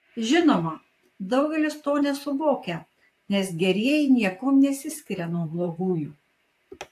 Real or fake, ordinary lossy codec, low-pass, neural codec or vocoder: fake; AAC, 64 kbps; 14.4 kHz; vocoder, 44.1 kHz, 128 mel bands, Pupu-Vocoder